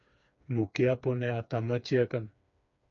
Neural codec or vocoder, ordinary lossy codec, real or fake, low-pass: codec, 16 kHz, 4 kbps, FreqCodec, smaller model; AAC, 64 kbps; fake; 7.2 kHz